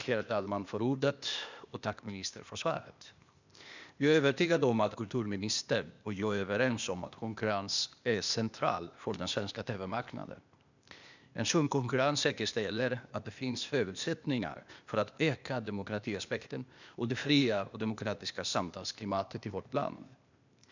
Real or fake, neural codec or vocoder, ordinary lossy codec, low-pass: fake; codec, 16 kHz, 0.8 kbps, ZipCodec; none; 7.2 kHz